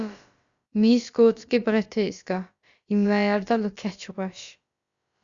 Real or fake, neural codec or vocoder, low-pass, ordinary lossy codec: fake; codec, 16 kHz, about 1 kbps, DyCAST, with the encoder's durations; 7.2 kHz; Opus, 64 kbps